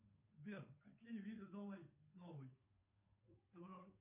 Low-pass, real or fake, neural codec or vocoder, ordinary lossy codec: 3.6 kHz; fake; codec, 16 kHz, 2 kbps, FunCodec, trained on Chinese and English, 25 frames a second; MP3, 24 kbps